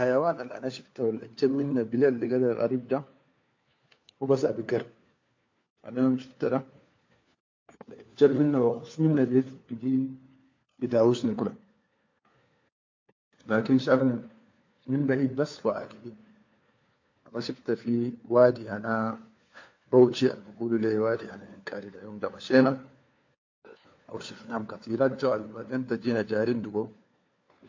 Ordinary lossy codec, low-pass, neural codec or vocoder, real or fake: MP3, 48 kbps; 7.2 kHz; codec, 16 kHz, 4 kbps, FunCodec, trained on LibriTTS, 50 frames a second; fake